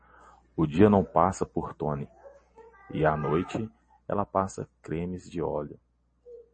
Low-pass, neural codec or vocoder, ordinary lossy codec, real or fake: 9.9 kHz; none; MP3, 32 kbps; real